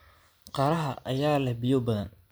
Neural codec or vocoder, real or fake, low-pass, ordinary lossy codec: none; real; none; none